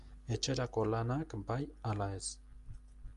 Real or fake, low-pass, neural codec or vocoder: real; 10.8 kHz; none